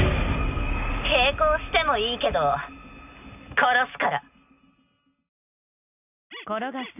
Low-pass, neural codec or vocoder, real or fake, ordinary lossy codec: 3.6 kHz; none; real; none